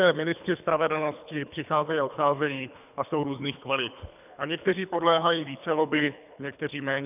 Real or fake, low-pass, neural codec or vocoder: fake; 3.6 kHz; codec, 24 kHz, 3 kbps, HILCodec